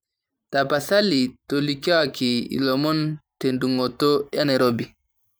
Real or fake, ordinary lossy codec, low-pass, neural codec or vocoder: fake; none; none; vocoder, 44.1 kHz, 128 mel bands every 512 samples, BigVGAN v2